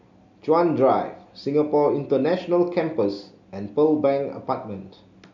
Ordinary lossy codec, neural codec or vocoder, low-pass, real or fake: none; none; 7.2 kHz; real